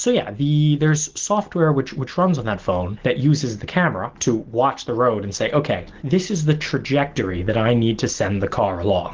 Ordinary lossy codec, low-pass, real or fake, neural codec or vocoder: Opus, 16 kbps; 7.2 kHz; real; none